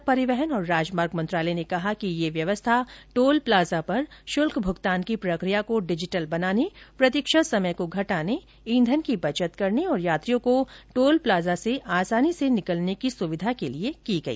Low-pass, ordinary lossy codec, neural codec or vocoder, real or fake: none; none; none; real